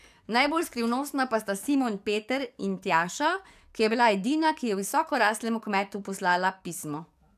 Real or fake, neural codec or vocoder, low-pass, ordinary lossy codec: fake; codec, 44.1 kHz, 7.8 kbps, DAC; 14.4 kHz; none